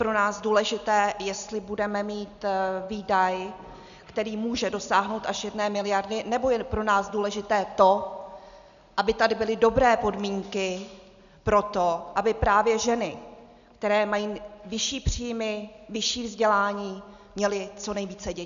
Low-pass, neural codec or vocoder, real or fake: 7.2 kHz; none; real